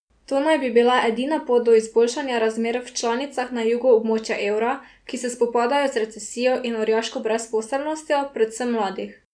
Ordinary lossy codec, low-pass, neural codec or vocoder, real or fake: none; 9.9 kHz; none; real